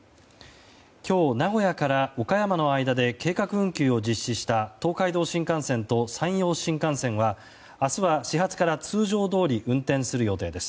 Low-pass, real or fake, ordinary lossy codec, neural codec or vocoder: none; real; none; none